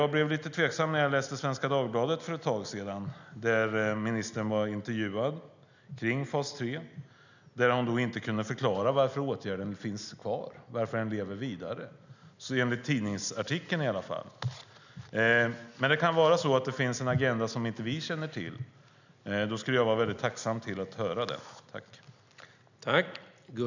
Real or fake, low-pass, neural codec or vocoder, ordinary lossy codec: real; 7.2 kHz; none; none